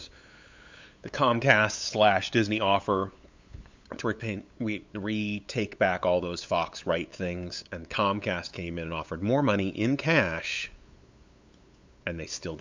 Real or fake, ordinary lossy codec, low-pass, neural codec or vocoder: fake; MP3, 64 kbps; 7.2 kHz; codec, 16 kHz, 8 kbps, FunCodec, trained on LibriTTS, 25 frames a second